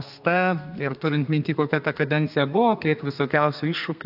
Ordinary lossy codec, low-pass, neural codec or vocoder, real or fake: AAC, 48 kbps; 5.4 kHz; codec, 32 kHz, 1.9 kbps, SNAC; fake